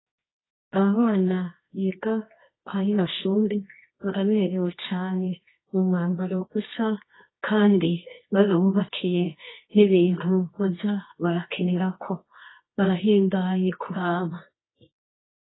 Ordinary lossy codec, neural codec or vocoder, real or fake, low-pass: AAC, 16 kbps; codec, 24 kHz, 0.9 kbps, WavTokenizer, medium music audio release; fake; 7.2 kHz